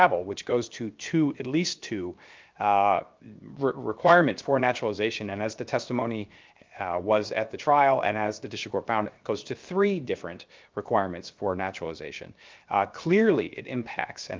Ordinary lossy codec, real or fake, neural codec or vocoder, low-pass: Opus, 32 kbps; fake; codec, 16 kHz, 0.7 kbps, FocalCodec; 7.2 kHz